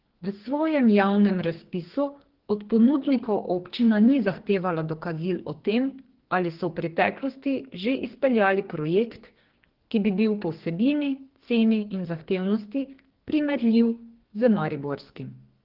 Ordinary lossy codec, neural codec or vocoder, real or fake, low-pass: Opus, 16 kbps; codec, 44.1 kHz, 2.6 kbps, SNAC; fake; 5.4 kHz